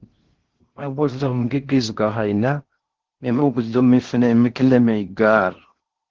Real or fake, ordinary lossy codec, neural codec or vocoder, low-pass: fake; Opus, 16 kbps; codec, 16 kHz in and 24 kHz out, 0.6 kbps, FocalCodec, streaming, 4096 codes; 7.2 kHz